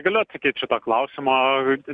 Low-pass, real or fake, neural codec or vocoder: 9.9 kHz; real; none